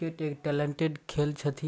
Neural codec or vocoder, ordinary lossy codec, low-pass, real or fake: none; none; none; real